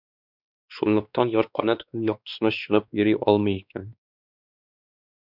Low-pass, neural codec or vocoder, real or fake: 5.4 kHz; codec, 16 kHz, 2 kbps, X-Codec, WavLM features, trained on Multilingual LibriSpeech; fake